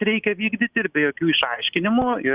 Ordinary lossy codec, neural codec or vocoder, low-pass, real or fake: Opus, 64 kbps; none; 3.6 kHz; real